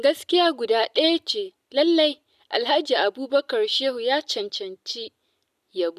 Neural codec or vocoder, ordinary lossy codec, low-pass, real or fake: none; none; 14.4 kHz; real